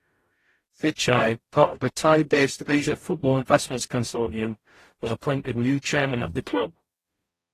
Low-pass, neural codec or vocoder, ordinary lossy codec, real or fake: 14.4 kHz; codec, 44.1 kHz, 0.9 kbps, DAC; AAC, 48 kbps; fake